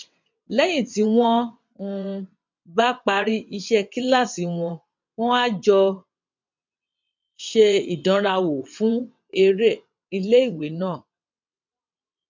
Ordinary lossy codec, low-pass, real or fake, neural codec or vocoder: MP3, 64 kbps; 7.2 kHz; fake; vocoder, 22.05 kHz, 80 mel bands, WaveNeXt